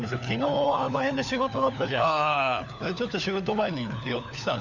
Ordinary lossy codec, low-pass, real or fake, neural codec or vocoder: none; 7.2 kHz; fake; codec, 16 kHz, 4 kbps, FunCodec, trained on LibriTTS, 50 frames a second